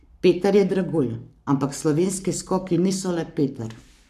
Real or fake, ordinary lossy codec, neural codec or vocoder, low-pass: fake; none; codec, 44.1 kHz, 7.8 kbps, Pupu-Codec; 14.4 kHz